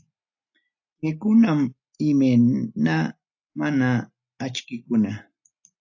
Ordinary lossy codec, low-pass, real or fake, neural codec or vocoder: AAC, 48 kbps; 7.2 kHz; real; none